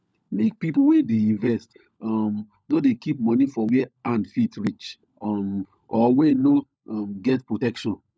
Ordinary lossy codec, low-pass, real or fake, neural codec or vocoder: none; none; fake; codec, 16 kHz, 16 kbps, FunCodec, trained on LibriTTS, 50 frames a second